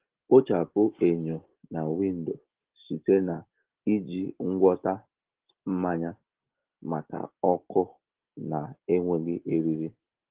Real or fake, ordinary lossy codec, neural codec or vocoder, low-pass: real; Opus, 16 kbps; none; 3.6 kHz